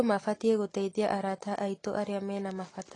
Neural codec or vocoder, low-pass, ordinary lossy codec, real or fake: none; 10.8 kHz; AAC, 32 kbps; real